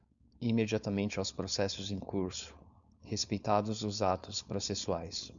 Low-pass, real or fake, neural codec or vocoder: 7.2 kHz; fake; codec, 16 kHz, 4.8 kbps, FACodec